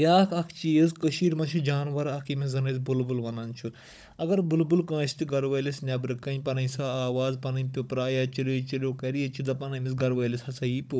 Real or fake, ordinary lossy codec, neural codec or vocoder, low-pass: fake; none; codec, 16 kHz, 16 kbps, FunCodec, trained on Chinese and English, 50 frames a second; none